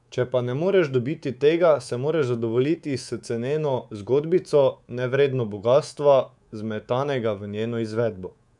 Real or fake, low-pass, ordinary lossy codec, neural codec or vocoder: fake; 10.8 kHz; none; codec, 24 kHz, 3.1 kbps, DualCodec